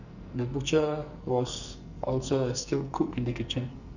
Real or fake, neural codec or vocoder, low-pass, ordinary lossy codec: fake; codec, 44.1 kHz, 2.6 kbps, SNAC; 7.2 kHz; none